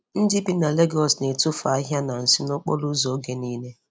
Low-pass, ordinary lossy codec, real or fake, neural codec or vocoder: none; none; real; none